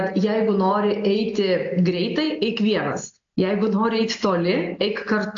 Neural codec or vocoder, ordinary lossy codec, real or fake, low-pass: none; AAC, 64 kbps; real; 7.2 kHz